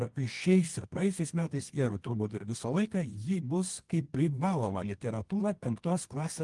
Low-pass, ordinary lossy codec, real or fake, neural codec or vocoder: 10.8 kHz; Opus, 24 kbps; fake; codec, 24 kHz, 0.9 kbps, WavTokenizer, medium music audio release